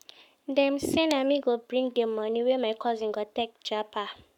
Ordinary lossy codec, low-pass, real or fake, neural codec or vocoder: none; 19.8 kHz; fake; codec, 44.1 kHz, 7.8 kbps, Pupu-Codec